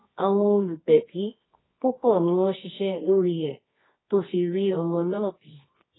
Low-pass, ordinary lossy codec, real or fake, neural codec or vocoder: 7.2 kHz; AAC, 16 kbps; fake; codec, 24 kHz, 0.9 kbps, WavTokenizer, medium music audio release